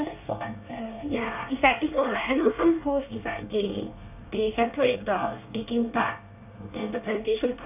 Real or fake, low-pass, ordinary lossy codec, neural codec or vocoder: fake; 3.6 kHz; none; codec, 24 kHz, 1 kbps, SNAC